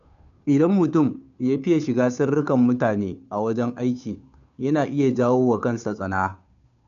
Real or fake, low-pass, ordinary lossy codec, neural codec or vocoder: fake; 7.2 kHz; none; codec, 16 kHz, 2 kbps, FunCodec, trained on Chinese and English, 25 frames a second